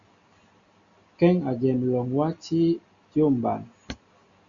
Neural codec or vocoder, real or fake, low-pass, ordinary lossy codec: none; real; 7.2 kHz; Opus, 64 kbps